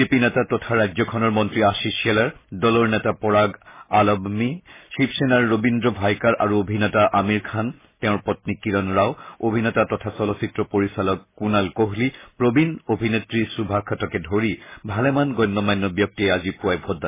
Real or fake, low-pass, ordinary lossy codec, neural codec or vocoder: real; 3.6 kHz; MP3, 16 kbps; none